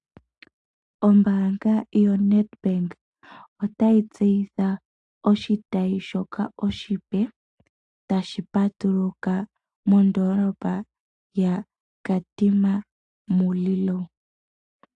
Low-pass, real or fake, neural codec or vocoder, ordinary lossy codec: 10.8 kHz; real; none; AAC, 64 kbps